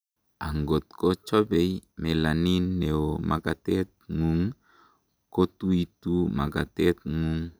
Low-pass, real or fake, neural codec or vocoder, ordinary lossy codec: none; real; none; none